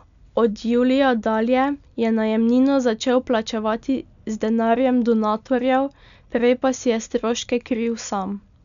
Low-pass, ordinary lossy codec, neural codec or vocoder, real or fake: 7.2 kHz; none; none; real